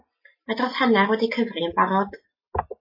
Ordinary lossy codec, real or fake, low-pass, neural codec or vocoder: MP3, 24 kbps; real; 5.4 kHz; none